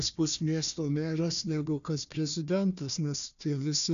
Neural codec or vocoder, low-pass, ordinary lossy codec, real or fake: codec, 16 kHz, 1 kbps, FunCodec, trained on Chinese and English, 50 frames a second; 7.2 kHz; MP3, 96 kbps; fake